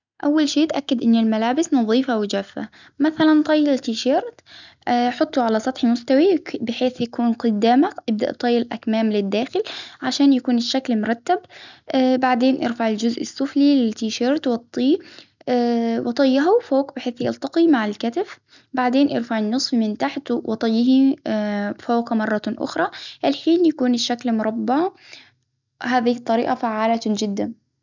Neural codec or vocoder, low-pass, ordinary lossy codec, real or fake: none; 7.2 kHz; none; real